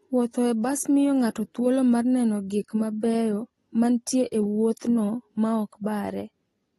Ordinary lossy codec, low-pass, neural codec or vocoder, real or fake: AAC, 32 kbps; 19.8 kHz; none; real